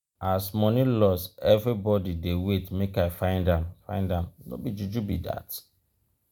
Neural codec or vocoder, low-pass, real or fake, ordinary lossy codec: none; 19.8 kHz; real; none